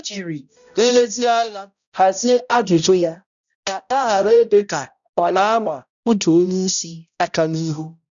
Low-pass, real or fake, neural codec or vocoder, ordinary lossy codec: 7.2 kHz; fake; codec, 16 kHz, 0.5 kbps, X-Codec, HuBERT features, trained on balanced general audio; none